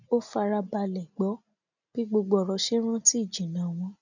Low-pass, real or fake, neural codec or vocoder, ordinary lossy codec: 7.2 kHz; real; none; none